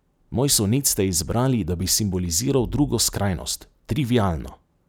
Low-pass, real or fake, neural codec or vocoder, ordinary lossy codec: none; real; none; none